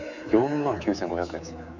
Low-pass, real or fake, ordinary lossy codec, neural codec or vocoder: 7.2 kHz; fake; none; codec, 24 kHz, 3.1 kbps, DualCodec